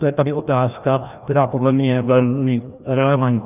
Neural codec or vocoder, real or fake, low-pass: codec, 16 kHz, 1 kbps, FreqCodec, larger model; fake; 3.6 kHz